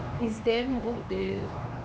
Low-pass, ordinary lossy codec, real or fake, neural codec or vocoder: none; none; fake; codec, 16 kHz, 2 kbps, X-Codec, HuBERT features, trained on LibriSpeech